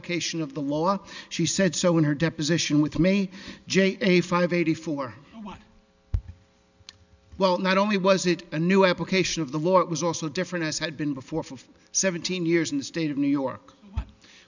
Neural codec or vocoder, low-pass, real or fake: none; 7.2 kHz; real